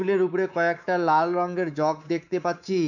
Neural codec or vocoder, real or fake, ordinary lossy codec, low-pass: codec, 24 kHz, 3.1 kbps, DualCodec; fake; none; 7.2 kHz